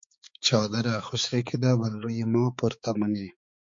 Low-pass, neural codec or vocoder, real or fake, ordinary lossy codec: 7.2 kHz; codec, 16 kHz, 4 kbps, X-Codec, HuBERT features, trained on balanced general audio; fake; MP3, 48 kbps